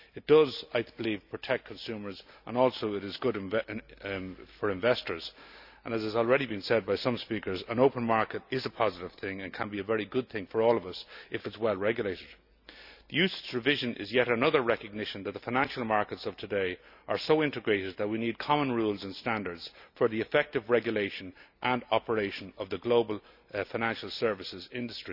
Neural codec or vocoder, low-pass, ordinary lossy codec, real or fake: none; 5.4 kHz; none; real